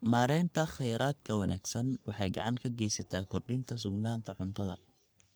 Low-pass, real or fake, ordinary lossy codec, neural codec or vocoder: none; fake; none; codec, 44.1 kHz, 3.4 kbps, Pupu-Codec